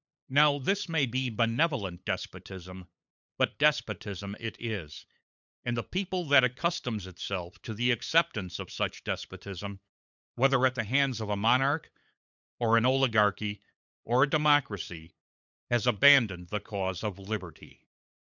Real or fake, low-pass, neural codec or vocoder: fake; 7.2 kHz; codec, 16 kHz, 8 kbps, FunCodec, trained on LibriTTS, 25 frames a second